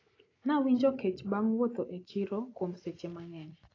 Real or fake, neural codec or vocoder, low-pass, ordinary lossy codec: real; none; 7.2 kHz; AAC, 32 kbps